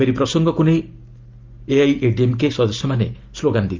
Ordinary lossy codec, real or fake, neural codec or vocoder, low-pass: Opus, 24 kbps; real; none; 7.2 kHz